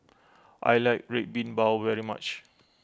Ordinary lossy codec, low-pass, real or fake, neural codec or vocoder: none; none; real; none